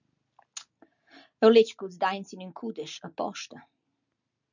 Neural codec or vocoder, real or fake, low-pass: none; real; 7.2 kHz